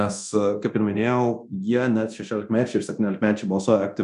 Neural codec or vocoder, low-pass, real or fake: codec, 24 kHz, 0.9 kbps, DualCodec; 10.8 kHz; fake